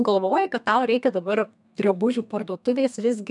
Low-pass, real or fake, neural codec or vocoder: 10.8 kHz; fake; codec, 32 kHz, 1.9 kbps, SNAC